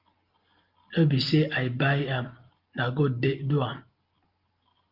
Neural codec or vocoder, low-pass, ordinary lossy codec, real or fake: none; 5.4 kHz; Opus, 32 kbps; real